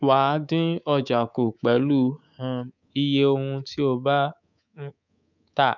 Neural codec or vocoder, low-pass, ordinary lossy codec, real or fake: codec, 24 kHz, 3.1 kbps, DualCodec; 7.2 kHz; none; fake